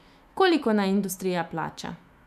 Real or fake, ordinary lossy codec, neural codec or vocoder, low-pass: fake; none; autoencoder, 48 kHz, 128 numbers a frame, DAC-VAE, trained on Japanese speech; 14.4 kHz